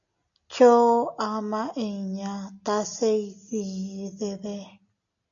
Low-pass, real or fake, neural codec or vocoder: 7.2 kHz; real; none